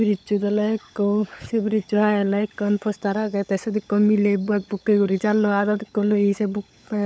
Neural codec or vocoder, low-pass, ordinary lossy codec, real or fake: codec, 16 kHz, 16 kbps, FunCodec, trained on LibriTTS, 50 frames a second; none; none; fake